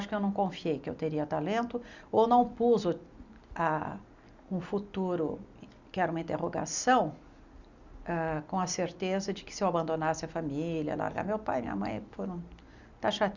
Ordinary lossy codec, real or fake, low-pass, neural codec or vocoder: Opus, 64 kbps; real; 7.2 kHz; none